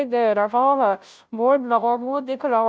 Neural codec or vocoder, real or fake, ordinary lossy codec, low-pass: codec, 16 kHz, 0.5 kbps, FunCodec, trained on Chinese and English, 25 frames a second; fake; none; none